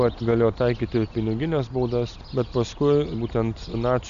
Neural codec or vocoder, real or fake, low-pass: none; real; 7.2 kHz